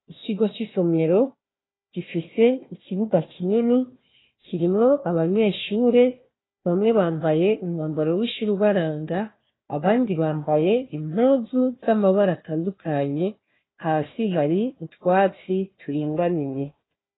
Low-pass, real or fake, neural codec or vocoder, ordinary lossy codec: 7.2 kHz; fake; codec, 16 kHz, 1 kbps, FunCodec, trained on Chinese and English, 50 frames a second; AAC, 16 kbps